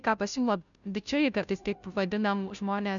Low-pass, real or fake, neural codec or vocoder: 7.2 kHz; fake; codec, 16 kHz, 0.5 kbps, FunCodec, trained on Chinese and English, 25 frames a second